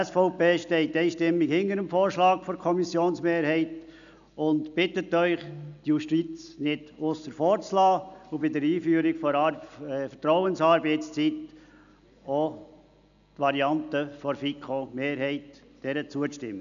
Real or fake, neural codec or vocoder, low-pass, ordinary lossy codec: real; none; 7.2 kHz; none